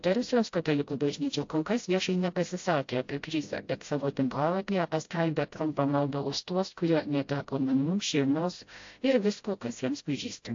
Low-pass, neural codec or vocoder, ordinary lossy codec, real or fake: 7.2 kHz; codec, 16 kHz, 0.5 kbps, FreqCodec, smaller model; AAC, 48 kbps; fake